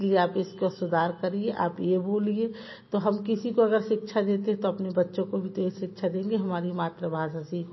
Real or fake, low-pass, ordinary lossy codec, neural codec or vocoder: real; 7.2 kHz; MP3, 24 kbps; none